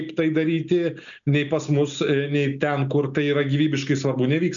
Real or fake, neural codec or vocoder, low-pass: real; none; 7.2 kHz